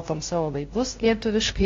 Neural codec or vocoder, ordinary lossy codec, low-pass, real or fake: codec, 16 kHz, 0.5 kbps, FunCodec, trained on LibriTTS, 25 frames a second; AAC, 32 kbps; 7.2 kHz; fake